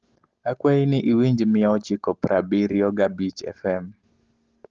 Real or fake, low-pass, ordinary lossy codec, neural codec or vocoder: real; 7.2 kHz; Opus, 16 kbps; none